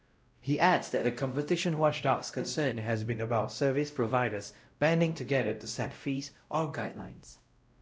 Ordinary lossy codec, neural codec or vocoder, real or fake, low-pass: none; codec, 16 kHz, 0.5 kbps, X-Codec, WavLM features, trained on Multilingual LibriSpeech; fake; none